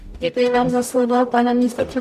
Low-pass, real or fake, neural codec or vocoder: 14.4 kHz; fake; codec, 44.1 kHz, 0.9 kbps, DAC